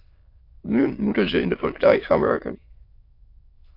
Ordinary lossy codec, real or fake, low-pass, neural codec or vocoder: AAC, 32 kbps; fake; 5.4 kHz; autoencoder, 22.05 kHz, a latent of 192 numbers a frame, VITS, trained on many speakers